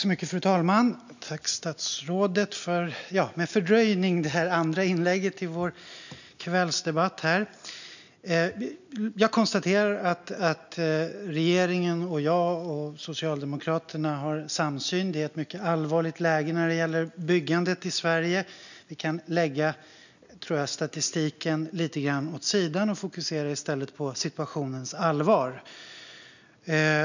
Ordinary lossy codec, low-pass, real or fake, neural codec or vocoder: none; 7.2 kHz; real; none